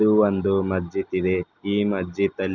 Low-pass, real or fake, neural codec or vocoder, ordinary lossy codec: 7.2 kHz; real; none; none